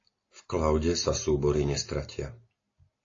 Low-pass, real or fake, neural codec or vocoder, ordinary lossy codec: 7.2 kHz; real; none; AAC, 32 kbps